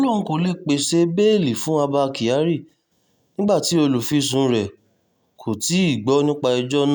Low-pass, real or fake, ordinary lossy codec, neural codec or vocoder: none; real; none; none